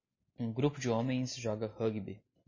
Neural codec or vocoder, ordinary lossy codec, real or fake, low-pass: none; MP3, 32 kbps; real; 7.2 kHz